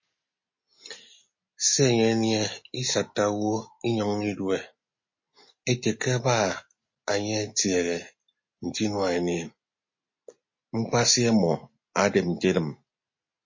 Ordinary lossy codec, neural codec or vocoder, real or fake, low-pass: MP3, 32 kbps; none; real; 7.2 kHz